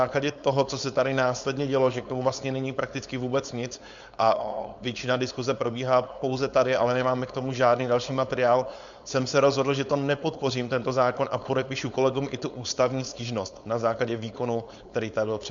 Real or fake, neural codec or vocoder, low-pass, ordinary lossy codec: fake; codec, 16 kHz, 4.8 kbps, FACodec; 7.2 kHz; Opus, 64 kbps